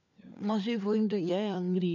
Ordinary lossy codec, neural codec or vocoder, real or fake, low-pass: none; codec, 16 kHz, 4 kbps, FunCodec, trained on LibriTTS, 50 frames a second; fake; 7.2 kHz